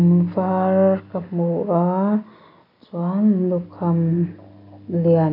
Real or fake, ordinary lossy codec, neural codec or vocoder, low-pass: real; MP3, 48 kbps; none; 5.4 kHz